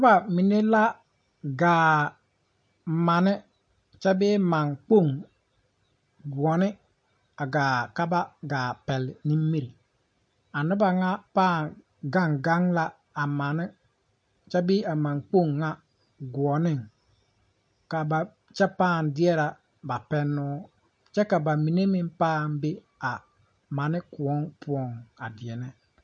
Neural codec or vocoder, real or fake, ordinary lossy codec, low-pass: none; real; MP3, 48 kbps; 9.9 kHz